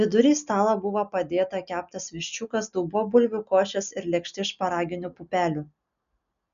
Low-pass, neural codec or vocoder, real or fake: 7.2 kHz; none; real